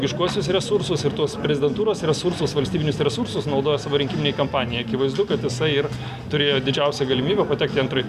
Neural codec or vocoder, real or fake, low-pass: none; real; 14.4 kHz